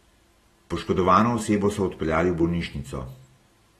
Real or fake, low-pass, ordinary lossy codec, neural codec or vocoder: real; 19.8 kHz; AAC, 32 kbps; none